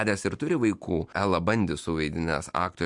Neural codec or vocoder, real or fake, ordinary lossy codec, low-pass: none; real; MP3, 64 kbps; 10.8 kHz